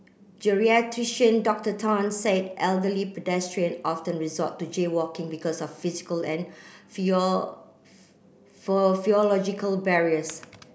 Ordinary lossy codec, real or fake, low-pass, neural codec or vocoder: none; real; none; none